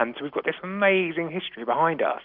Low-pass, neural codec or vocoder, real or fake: 5.4 kHz; none; real